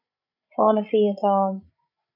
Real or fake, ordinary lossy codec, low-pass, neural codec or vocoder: fake; AAC, 48 kbps; 5.4 kHz; codec, 16 kHz, 16 kbps, FreqCodec, larger model